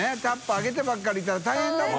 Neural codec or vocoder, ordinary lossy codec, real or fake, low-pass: none; none; real; none